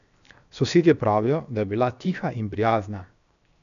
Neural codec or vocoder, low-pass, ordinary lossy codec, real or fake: codec, 16 kHz, 0.7 kbps, FocalCodec; 7.2 kHz; none; fake